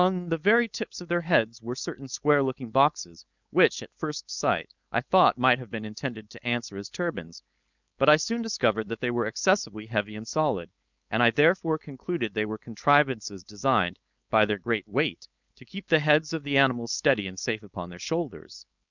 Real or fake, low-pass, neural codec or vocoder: fake; 7.2 kHz; codec, 16 kHz, 4.8 kbps, FACodec